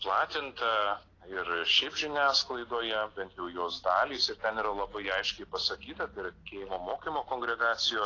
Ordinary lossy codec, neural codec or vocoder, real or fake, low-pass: AAC, 32 kbps; none; real; 7.2 kHz